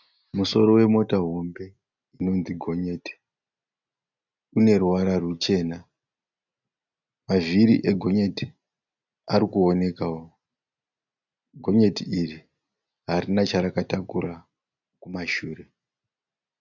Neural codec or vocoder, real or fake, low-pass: none; real; 7.2 kHz